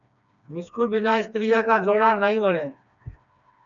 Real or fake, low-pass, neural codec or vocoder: fake; 7.2 kHz; codec, 16 kHz, 2 kbps, FreqCodec, smaller model